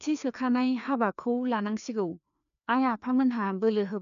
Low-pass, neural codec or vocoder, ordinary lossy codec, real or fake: 7.2 kHz; codec, 16 kHz, 2 kbps, FreqCodec, larger model; none; fake